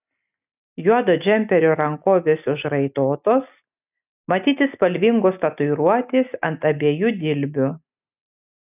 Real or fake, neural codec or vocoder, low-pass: real; none; 3.6 kHz